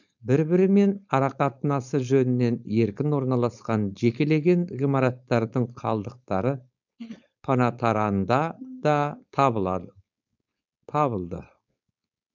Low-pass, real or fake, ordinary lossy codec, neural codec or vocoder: 7.2 kHz; fake; none; codec, 16 kHz, 4.8 kbps, FACodec